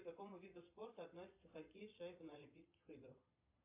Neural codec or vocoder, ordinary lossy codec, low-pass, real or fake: vocoder, 22.05 kHz, 80 mel bands, Vocos; MP3, 24 kbps; 3.6 kHz; fake